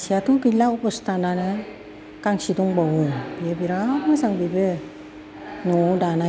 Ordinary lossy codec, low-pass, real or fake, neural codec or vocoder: none; none; real; none